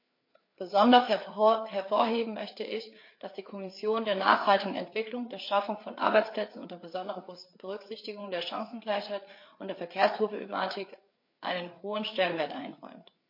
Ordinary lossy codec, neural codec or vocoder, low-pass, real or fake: MP3, 32 kbps; codec, 16 kHz, 4 kbps, FreqCodec, larger model; 5.4 kHz; fake